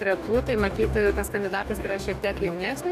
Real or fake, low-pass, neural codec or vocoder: fake; 14.4 kHz; codec, 44.1 kHz, 2.6 kbps, DAC